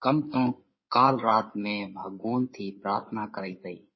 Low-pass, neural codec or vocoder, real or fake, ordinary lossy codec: 7.2 kHz; codec, 16 kHz in and 24 kHz out, 2.2 kbps, FireRedTTS-2 codec; fake; MP3, 24 kbps